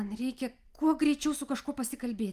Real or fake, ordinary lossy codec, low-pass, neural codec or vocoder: real; Opus, 32 kbps; 14.4 kHz; none